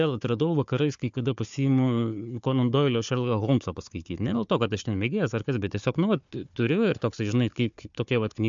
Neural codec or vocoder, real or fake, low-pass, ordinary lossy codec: codec, 16 kHz, 4 kbps, FunCodec, trained on Chinese and English, 50 frames a second; fake; 7.2 kHz; MP3, 64 kbps